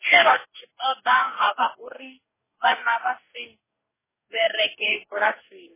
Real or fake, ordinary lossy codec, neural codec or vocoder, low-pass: fake; MP3, 16 kbps; codec, 44.1 kHz, 2.6 kbps, DAC; 3.6 kHz